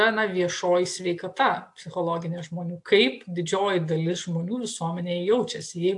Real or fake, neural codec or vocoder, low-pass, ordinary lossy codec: real; none; 10.8 kHz; AAC, 64 kbps